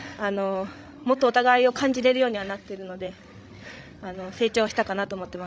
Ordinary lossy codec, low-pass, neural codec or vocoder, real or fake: none; none; codec, 16 kHz, 16 kbps, FreqCodec, larger model; fake